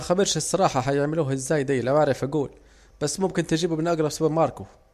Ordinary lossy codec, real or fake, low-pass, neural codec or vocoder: MP3, 64 kbps; real; 14.4 kHz; none